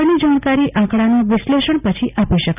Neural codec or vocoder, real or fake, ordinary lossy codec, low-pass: none; real; none; 3.6 kHz